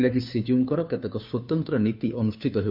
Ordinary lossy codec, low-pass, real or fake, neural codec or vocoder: none; 5.4 kHz; fake; codec, 16 kHz, 2 kbps, FunCodec, trained on Chinese and English, 25 frames a second